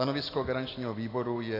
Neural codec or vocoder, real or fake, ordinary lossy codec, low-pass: none; real; AAC, 24 kbps; 5.4 kHz